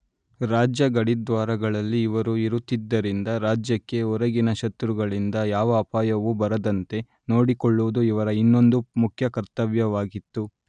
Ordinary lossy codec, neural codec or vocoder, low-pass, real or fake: none; none; 9.9 kHz; real